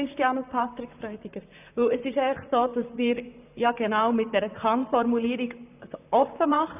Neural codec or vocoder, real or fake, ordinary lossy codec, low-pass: vocoder, 44.1 kHz, 128 mel bands, Pupu-Vocoder; fake; none; 3.6 kHz